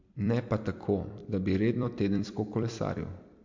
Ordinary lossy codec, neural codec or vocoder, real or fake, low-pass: MP3, 48 kbps; vocoder, 44.1 kHz, 128 mel bands every 256 samples, BigVGAN v2; fake; 7.2 kHz